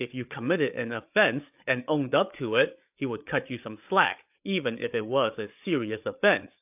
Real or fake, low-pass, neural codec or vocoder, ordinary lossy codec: fake; 3.6 kHz; codec, 16 kHz, 8 kbps, FreqCodec, larger model; AAC, 32 kbps